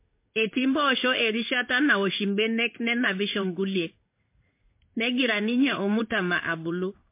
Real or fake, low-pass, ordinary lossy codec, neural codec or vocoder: fake; 3.6 kHz; MP3, 24 kbps; codec, 16 kHz in and 24 kHz out, 1 kbps, XY-Tokenizer